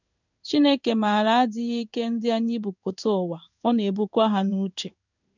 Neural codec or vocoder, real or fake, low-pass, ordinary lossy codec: codec, 16 kHz in and 24 kHz out, 1 kbps, XY-Tokenizer; fake; 7.2 kHz; none